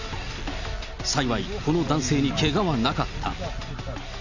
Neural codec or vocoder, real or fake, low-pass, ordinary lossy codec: none; real; 7.2 kHz; none